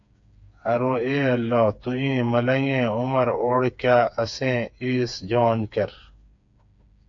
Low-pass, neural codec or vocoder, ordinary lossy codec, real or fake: 7.2 kHz; codec, 16 kHz, 4 kbps, FreqCodec, smaller model; AAC, 64 kbps; fake